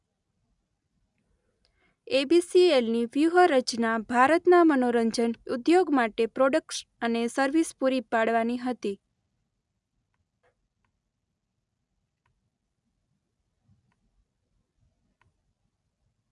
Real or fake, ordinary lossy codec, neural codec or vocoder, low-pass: real; none; none; 10.8 kHz